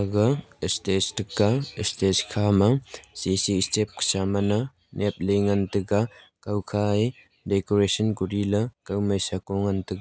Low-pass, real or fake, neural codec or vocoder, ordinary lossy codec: none; real; none; none